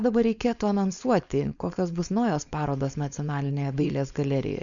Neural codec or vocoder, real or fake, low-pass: codec, 16 kHz, 4.8 kbps, FACodec; fake; 7.2 kHz